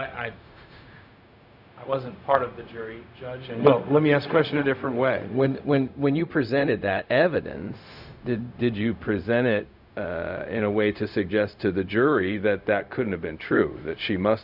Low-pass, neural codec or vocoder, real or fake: 5.4 kHz; codec, 16 kHz, 0.4 kbps, LongCat-Audio-Codec; fake